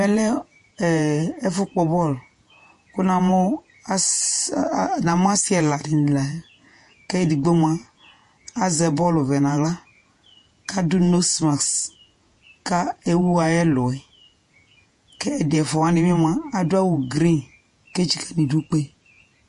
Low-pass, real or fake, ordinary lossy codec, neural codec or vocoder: 14.4 kHz; fake; MP3, 48 kbps; vocoder, 48 kHz, 128 mel bands, Vocos